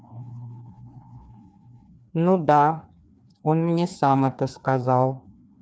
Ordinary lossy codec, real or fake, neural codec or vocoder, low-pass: none; fake; codec, 16 kHz, 2 kbps, FreqCodec, larger model; none